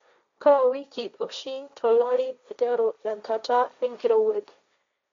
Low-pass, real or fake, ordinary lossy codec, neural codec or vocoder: 7.2 kHz; fake; MP3, 48 kbps; codec, 16 kHz, 1.1 kbps, Voila-Tokenizer